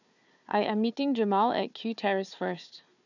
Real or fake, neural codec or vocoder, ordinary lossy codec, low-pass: fake; codec, 16 kHz, 4 kbps, FunCodec, trained on Chinese and English, 50 frames a second; none; 7.2 kHz